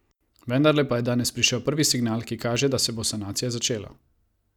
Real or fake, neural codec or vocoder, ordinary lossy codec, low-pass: real; none; none; 19.8 kHz